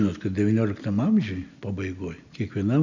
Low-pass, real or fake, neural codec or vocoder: 7.2 kHz; real; none